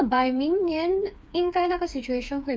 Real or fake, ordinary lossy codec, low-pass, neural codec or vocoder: fake; none; none; codec, 16 kHz, 4 kbps, FreqCodec, smaller model